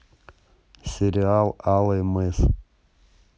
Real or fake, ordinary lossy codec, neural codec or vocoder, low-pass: real; none; none; none